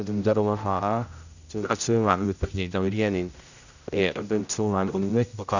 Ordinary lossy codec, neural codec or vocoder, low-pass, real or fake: none; codec, 16 kHz, 0.5 kbps, X-Codec, HuBERT features, trained on general audio; 7.2 kHz; fake